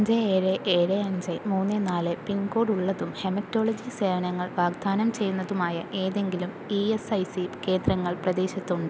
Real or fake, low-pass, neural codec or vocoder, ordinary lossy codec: real; none; none; none